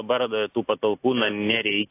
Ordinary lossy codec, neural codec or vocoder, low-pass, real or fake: AAC, 16 kbps; none; 3.6 kHz; real